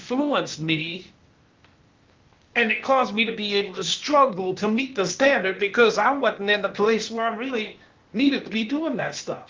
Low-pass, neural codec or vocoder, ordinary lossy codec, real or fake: 7.2 kHz; codec, 16 kHz, 0.8 kbps, ZipCodec; Opus, 24 kbps; fake